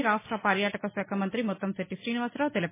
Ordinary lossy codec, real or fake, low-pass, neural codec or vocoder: MP3, 16 kbps; real; 3.6 kHz; none